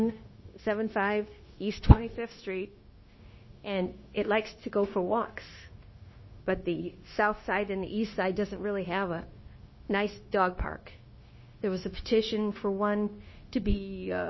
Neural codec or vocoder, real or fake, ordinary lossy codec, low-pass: codec, 16 kHz, 0.9 kbps, LongCat-Audio-Codec; fake; MP3, 24 kbps; 7.2 kHz